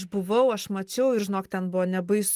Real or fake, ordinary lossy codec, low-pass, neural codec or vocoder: real; Opus, 24 kbps; 14.4 kHz; none